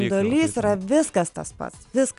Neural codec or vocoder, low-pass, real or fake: none; 10.8 kHz; real